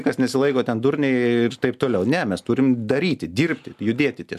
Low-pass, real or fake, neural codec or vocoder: 14.4 kHz; real; none